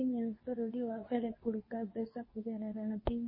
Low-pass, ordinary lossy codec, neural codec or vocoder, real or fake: 5.4 kHz; MP3, 24 kbps; codec, 24 kHz, 0.9 kbps, WavTokenizer, medium speech release version 2; fake